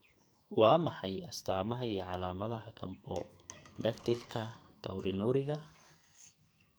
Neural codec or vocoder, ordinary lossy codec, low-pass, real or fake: codec, 44.1 kHz, 2.6 kbps, SNAC; none; none; fake